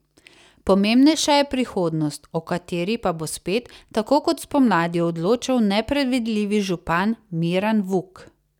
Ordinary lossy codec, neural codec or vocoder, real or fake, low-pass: none; none; real; 19.8 kHz